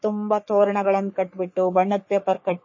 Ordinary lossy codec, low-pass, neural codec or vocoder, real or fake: MP3, 32 kbps; 7.2 kHz; codec, 44.1 kHz, 3.4 kbps, Pupu-Codec; fake